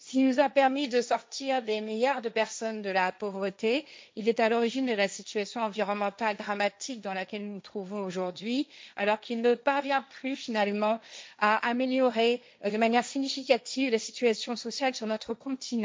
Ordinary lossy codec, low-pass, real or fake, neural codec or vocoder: none; none; fake; codec, 16 kHz, 1.1 kbps, Voila-Tokenizer